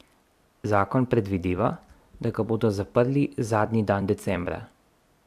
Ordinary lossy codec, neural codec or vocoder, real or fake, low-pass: AAC, 96 kbps; vocoder, 44.1 kHz, 128 mel bands every 256 samples, BigVGAN v2; fake; 14.4 kHz